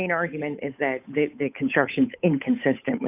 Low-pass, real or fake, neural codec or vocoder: 3.6 kHz; fake; codec, 16 kHz, 8 kbps, FunCodec, trained on Chinese and English, 25 frames a second